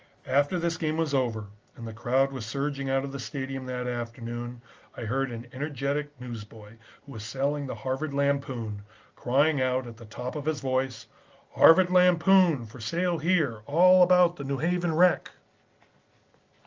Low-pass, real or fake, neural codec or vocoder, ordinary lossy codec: 7.2 kHz; real; none; Opus, 24 kbps